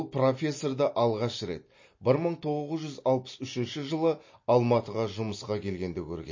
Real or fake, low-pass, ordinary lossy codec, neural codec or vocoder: real; 7.2 kHz; MP3, 32 kbps; none